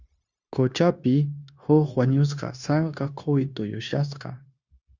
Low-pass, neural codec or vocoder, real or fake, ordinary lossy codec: 7.2 kHz; codec, 16 kHz, 0.9 kbps, LongCat-Audio-Codec; fake; Opus, 64 kbps